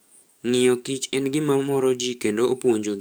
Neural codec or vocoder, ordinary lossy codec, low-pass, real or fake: codec, 44.1 kHz, 7.8 kbps, DAC; none; none; fake